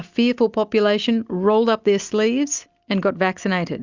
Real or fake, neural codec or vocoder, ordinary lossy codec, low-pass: real; none; Opus, 64 kbps; 7.2 kHz